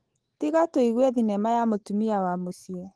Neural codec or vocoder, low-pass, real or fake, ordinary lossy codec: autoencoder, 48 kHz, 128 numbers a frame, DAC-VAE, trained on Japanese speech; 10.8 kHz; fake; Opus, 16 kbps